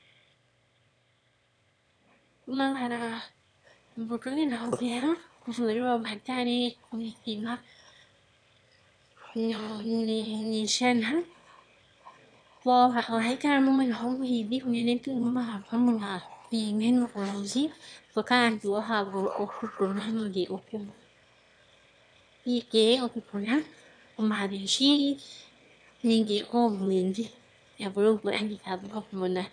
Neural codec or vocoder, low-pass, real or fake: autoencoder, 22.05 kHz, a latent of 192 numbers a frame, VITS, trained on one speaker; 9.9 kHz; fake